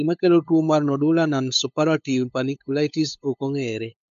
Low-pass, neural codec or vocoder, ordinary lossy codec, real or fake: 7.2 kHz; codec, 16 kHz, 8 kbps, FunCodec, trained on LibriTTS, 25 frames a second; MP3, 64 kbps; fake